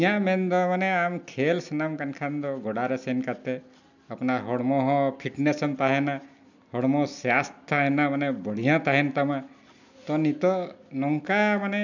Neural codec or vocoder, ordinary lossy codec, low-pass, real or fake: none; none; 7.2 kHz; real